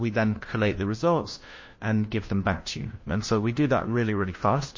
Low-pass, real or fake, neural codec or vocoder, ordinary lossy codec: 7.2 kHz; fake; codec, 16 kHz, 1 kbps, FunCodec, trained on LibriTTS, 50 frames a second; MP3, 32 kbps